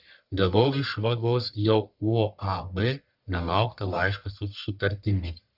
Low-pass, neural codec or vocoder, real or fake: 5.4 kHz; codec, 44.1 kHz, 1.7 kbps, Pupu-Codec; fake